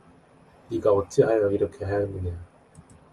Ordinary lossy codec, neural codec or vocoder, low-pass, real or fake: Opus, 32 kbps; none; 10.8 kHz; real